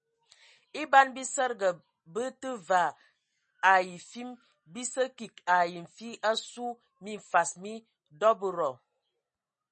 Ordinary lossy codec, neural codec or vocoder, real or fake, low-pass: MP3, 32 kbps; none; real; 10.8 kHz